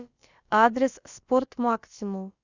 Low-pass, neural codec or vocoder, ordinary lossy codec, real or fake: 7.2 kHz; codec, 16 kHz, about 1 kbps, DyCAST, with the encoder's durations; Opus, 64 kbps; fake